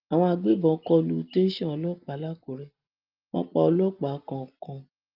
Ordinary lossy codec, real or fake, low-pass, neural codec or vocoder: Opus, 24 kbps; real; 5.4 kHz; none